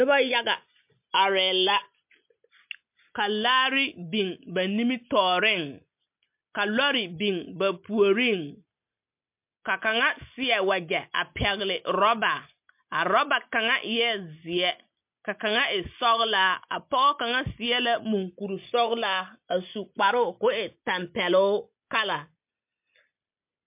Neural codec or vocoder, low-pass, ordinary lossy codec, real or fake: none; 3.6 kHz; MP3, 32 kbps; real